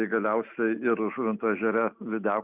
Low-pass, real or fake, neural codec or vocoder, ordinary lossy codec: 3.6 kHz; real; none; Opus, 64 kbps